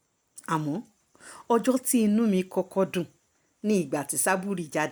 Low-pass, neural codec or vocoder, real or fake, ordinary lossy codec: none; none; real; none